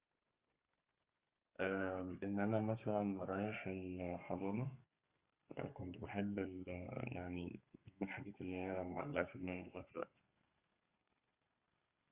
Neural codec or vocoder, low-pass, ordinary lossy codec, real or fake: codec, 32 kHz, 1.9 kbps, SNAC; 3.6 kHz; Opus, 32 kbps; fake